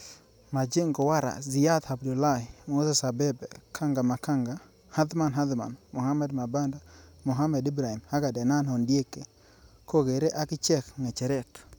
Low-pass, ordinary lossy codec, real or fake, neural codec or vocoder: none; none; real; none